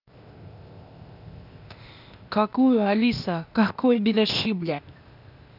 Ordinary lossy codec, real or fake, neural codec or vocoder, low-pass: none; fake; codec, 16 kHz, 0.8 kbps, ZipCodec; 5.4 kHz